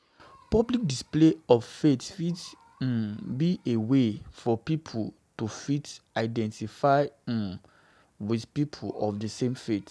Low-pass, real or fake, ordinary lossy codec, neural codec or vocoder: none; real; none; none